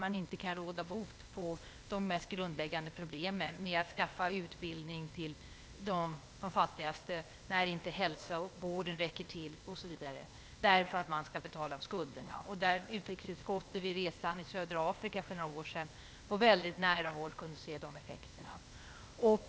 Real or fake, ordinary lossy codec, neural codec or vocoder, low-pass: fake; none; codec, 16 kHz, 0.8 kbps, ZipCodec; none